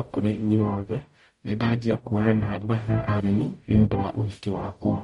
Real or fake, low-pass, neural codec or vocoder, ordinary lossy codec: fake; 19.8 kHz; codec, 44.1 kHz, 0.9 kbps, DAC; MP3, 48 kbps